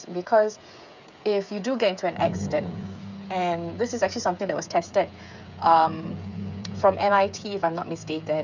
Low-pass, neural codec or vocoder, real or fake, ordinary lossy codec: 7.2 kHz; codec, 16 kHz, 8 kbps, FreqCodec, smaller model; fake; none